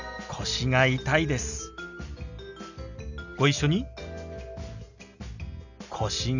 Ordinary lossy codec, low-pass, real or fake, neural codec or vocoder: none; 7.2 kHz; real; none